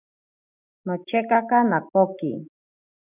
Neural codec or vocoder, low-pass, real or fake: none; 3.6 kHz; real